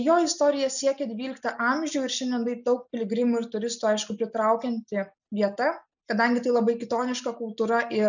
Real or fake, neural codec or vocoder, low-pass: real; none; 7.2 kHz